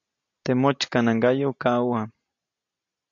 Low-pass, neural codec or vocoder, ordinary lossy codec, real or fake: 7.2 kHz; none; AAC, 64 kbps; real